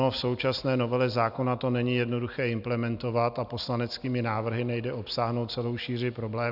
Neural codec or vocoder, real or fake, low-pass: none; real; 5.4 kHz